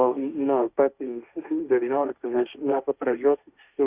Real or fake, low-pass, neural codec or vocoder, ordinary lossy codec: fake; 3.6 kHz; codec, 16 kHz, 1.1 kbps, Voila-Tokenizer; Opus, 64 kbps